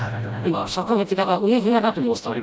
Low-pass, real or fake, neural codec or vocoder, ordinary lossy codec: none; fake; codec, 16 kHz, 0.5 kbps, FreqCodec, smaller model; none